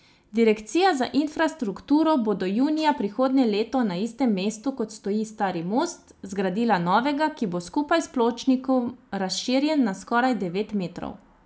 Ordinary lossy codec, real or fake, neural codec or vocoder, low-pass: none; real; none; none